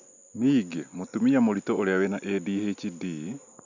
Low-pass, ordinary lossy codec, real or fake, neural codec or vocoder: 7.2 kHz; none; real; none